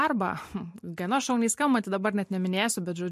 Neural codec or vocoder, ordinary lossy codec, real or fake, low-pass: none; MP3, 64 kbps; real; 14.4 kHz